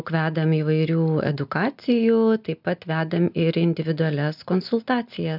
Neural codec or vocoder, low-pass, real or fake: none; 5.4 kHz; real